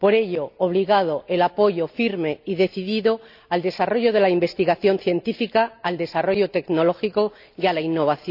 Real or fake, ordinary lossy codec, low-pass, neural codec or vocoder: real; none; 5.4 kHz; none